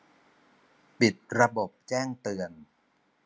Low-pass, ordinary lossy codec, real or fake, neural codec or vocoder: none; none; real; none